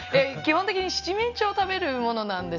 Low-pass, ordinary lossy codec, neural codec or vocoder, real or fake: 7.2 kHz; none; none; real